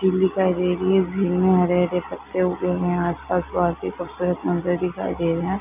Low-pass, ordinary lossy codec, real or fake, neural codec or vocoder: 3.6 kHz; none; real; none